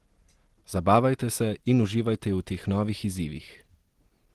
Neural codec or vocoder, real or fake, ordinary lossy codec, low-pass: none; real; Opus, 16 kbps; 14.4 kHz